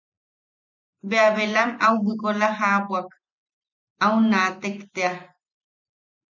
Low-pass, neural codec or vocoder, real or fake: 7.2 kHz; none; real